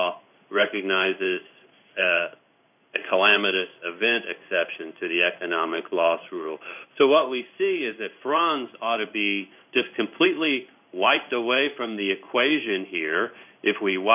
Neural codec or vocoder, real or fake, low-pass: codec, 16 kHz in and 24 kHz out, 1 kbps, XY-Tokenizer; fake; 3.6 kHz